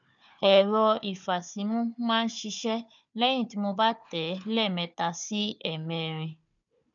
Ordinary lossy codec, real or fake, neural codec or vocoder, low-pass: none; fake; codec, 16 kHz, 4 kbps, FunCodec, trained on Chinese and English, 50 frames a second; 7.2 kHz